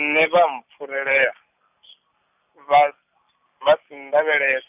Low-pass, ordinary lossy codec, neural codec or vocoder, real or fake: 3.6 kHz; none; none; real